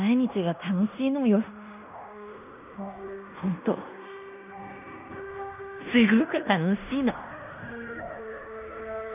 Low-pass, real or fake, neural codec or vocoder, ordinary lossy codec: 3.6 kHz; fake; codec, 16 kHz in and 24 kHz out, 0.9 kbps, LongCat-Audio-Codec, fine tuned four codebook decoder; none